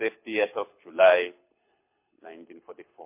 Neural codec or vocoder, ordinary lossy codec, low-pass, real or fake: codec, 24 kHz, 6 kbps, HILCodec; MP3, 24 kbps; 3.6 kHz; fake